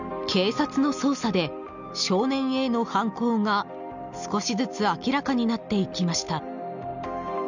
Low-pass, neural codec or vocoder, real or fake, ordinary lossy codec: 7.2 kHz; none; real; none